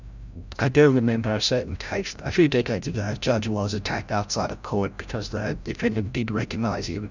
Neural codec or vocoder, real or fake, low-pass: codec, 16 kHz, 0.5 kbps, FreqCodec, larger model; fake; 7.2 kHz